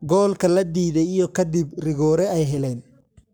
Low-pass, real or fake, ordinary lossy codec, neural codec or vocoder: none; fake; none; codec, 44.1 kHz, 7.8 kbps, Pupu-Codec